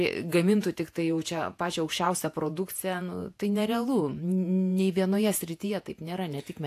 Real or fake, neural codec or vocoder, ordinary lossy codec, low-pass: fake; vocoder, 48 kHz, 128 mel bands, Vocos; AAC, 64 kbps; 14.4 kHz